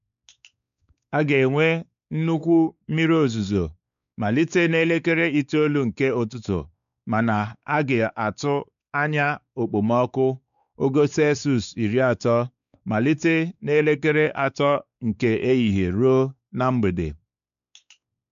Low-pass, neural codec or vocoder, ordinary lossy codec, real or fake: 7.2 kHz; codec, 16 kHz, 4 kbps, X-Codec, WavLM features, trained on Multilingual LibriSpeech; none; fake